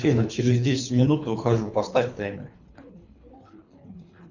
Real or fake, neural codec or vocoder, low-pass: fake; codec, 24 kHz, 3 kbps, HILCodec; 7.2 kHz